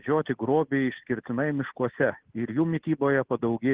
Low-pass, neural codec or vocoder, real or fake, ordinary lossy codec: 3.6 kHz; none; real; Opus, 16 kbps